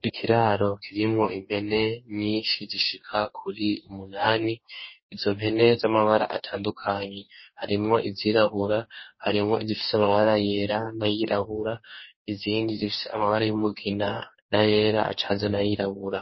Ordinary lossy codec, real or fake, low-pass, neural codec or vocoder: MP3, 24 kbps; fake; 7.2 kHz; codec, 44.1 kHz, 2.6 kbps, DAC